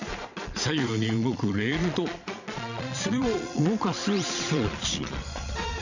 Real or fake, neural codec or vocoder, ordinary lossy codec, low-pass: fake; vocoder, 22.05 kHz, 80 mel bands, Vocos; none; 7.2 kHz